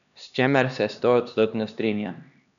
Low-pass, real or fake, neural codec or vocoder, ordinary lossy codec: 7.2 kHz; fake; codec, 16 kHz, 2 kbps, X-Codec, HuBERT features, trained on LibriSpeech; none